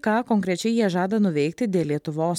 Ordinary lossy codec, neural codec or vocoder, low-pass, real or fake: MP3, 96 kbps; none; 19.8 kHz; real